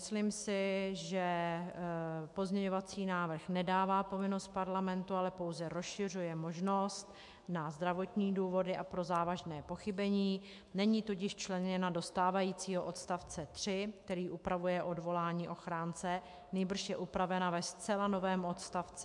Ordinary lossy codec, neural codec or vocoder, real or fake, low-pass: MP3, 64 kbps; autoencoder, 48 kHz, 128 numbers a frame, DAC-VAE, trained on Japanese speech; fake; 10.8 kHz